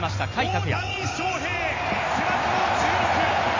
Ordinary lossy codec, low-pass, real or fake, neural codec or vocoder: AAC, 32 kbps; 7.2 kHz; real; none